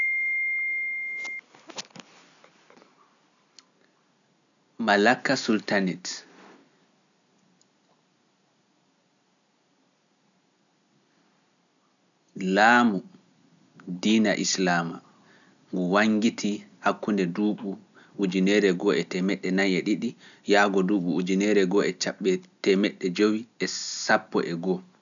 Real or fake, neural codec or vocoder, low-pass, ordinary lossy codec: real; none; 7.2 kHz; none